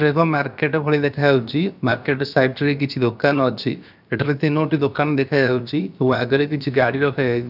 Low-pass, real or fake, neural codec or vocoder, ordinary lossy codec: 5.4 kHz; fake; codec, 16 kHz, about 1 kbps, DyCAST, with the encoder's durations; none